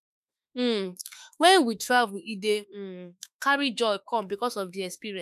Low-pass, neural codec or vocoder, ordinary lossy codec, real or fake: 14.4 kHz; autoencoder, 48 kHz, 32 numbers a frame, DAC-VAE, trained on Japanese speech; none; fake